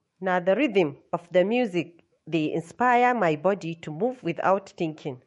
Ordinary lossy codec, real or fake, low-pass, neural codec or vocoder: MP3, 48 kbps; fake; 19.8 kHz; autoencoder, 48 kHz, 128 numbers a frame, DAC-VAE, trained on Japanese speech